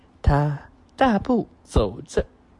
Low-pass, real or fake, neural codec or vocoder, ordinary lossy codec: 10.8 kHz; real; none; MP3, 64 kbps